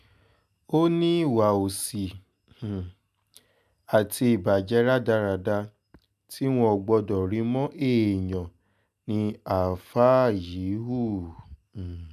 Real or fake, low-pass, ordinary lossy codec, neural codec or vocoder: real; 14.4 kHz; none; none